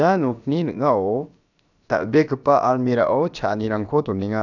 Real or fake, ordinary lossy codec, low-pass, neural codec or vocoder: fake; none; 7.2 kHz; codec, 16 kHz, about 1 kbps, DyCAST, with the encoder's durations